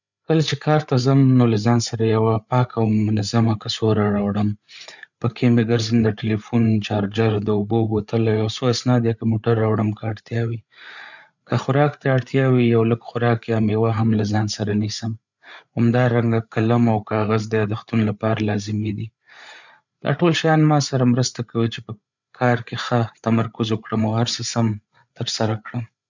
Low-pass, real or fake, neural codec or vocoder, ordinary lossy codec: 7.2 kHz; fake; codec, 16 kHz, 8 kbps, FreqCodec, larger model; none